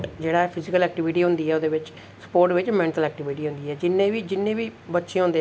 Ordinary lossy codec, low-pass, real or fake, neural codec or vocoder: none; none; real; none